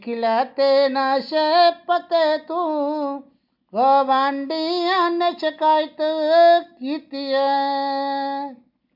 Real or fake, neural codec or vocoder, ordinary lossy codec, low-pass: real; none; MP3, 48 kbps; 5.4 kHz